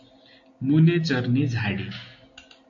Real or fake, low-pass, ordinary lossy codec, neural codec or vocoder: real; 7.2 kHz; AAC, 48 kbps; none